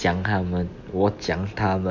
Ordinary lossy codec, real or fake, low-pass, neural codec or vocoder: none; real; 7.2 kHz; none